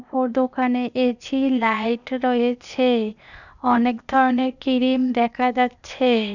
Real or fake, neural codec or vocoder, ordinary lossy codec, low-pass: fake; codec, 16 kHz, 0.8 kbps, ZipCodec; none; 7.2 kHz